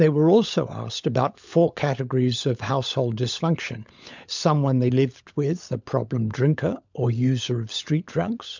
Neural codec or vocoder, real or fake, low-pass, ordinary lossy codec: codec, 16 kHz, 16 kbps, FunCodec, trained on LibriTTS, 50 frames a second; fake; 7.2 kHz; MP3, 64 kbps